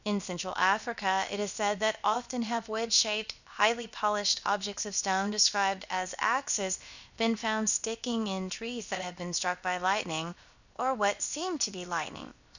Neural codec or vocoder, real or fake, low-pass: codec, 16 kHz, 0.7 kbps, FocalCodec; fake; 7.2 kHz